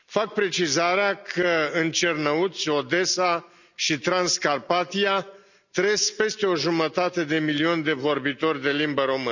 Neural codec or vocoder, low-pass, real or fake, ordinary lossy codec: none; 7.2 kHz; real; none